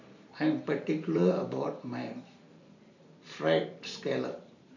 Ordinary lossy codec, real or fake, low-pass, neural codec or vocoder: none; fake; 7.2 kHz; vocoder, 44.1 kHz, 128 mel bands every 512 samples, BigVGAN v2